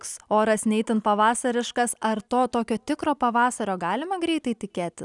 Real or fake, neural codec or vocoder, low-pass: real; none; 10.8 kHz